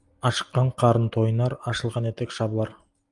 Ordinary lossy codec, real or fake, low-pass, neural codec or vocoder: Opus, 32 kbps; real; 10.8 kHz; none